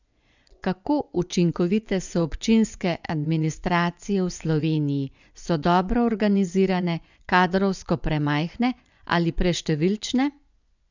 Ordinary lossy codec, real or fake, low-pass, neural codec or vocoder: none; fake; 7.2 kHz; vocoder, 24 kHz, 100 mel bands, Vocos